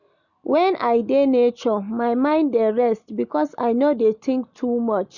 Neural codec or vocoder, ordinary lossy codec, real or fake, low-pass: none; none; real; 7.2 kHz